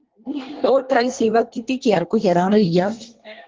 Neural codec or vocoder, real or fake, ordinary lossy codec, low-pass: codec, 24 kHz, 1 kbps, SNAC; fake; Opus, 16 kbps; 7.2 kHz